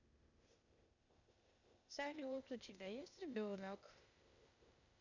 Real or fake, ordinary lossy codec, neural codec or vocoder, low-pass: fake; none; codec, 16 kHz, 0.8 kbps, ZipCodec; 7.2 kHz